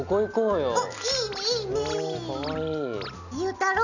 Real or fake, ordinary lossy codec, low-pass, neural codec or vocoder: real; none; 7.2 kHz; none